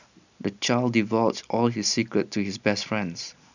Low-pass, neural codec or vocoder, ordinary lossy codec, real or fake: 7.2 kHz; none; none; real